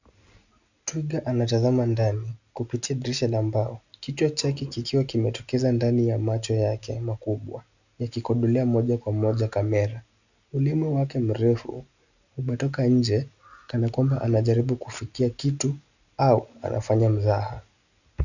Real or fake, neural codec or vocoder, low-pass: real; none; 7.2 kHz